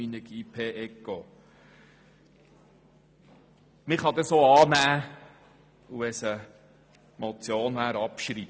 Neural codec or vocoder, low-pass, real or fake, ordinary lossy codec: none; none; real; none